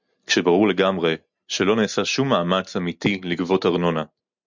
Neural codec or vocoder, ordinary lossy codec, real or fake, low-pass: none; MP3, 64 kbps; real; 7.2 kHz